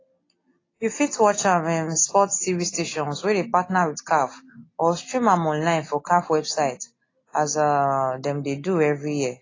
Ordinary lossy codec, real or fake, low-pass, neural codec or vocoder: AAC, 32 kbps; real; 7.2 kHz; none